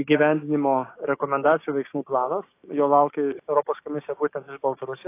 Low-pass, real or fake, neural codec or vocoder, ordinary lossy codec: 3.6 kHz; fake; codec, 16 kHz, 6 kbps, DAC; AAC, 24 kbps